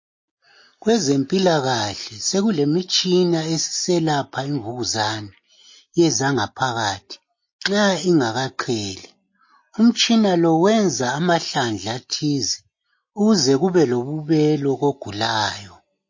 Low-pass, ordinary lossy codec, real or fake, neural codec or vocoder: 7.2 kHz; MP3, 32 kbps; real; none